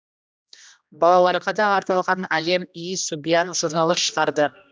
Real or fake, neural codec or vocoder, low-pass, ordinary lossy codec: fake; codec, 16 kHz, 1 kbps, X-Codec, HuBERT features, trained on general audio; none; none